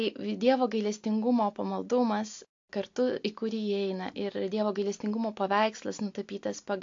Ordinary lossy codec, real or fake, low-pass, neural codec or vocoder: AAC, 48 kbps; real; 7.2 kHz; none